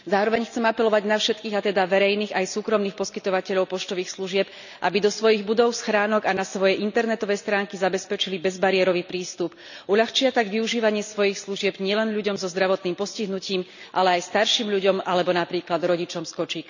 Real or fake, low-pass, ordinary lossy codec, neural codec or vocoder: real; 7.2 kHz; none; none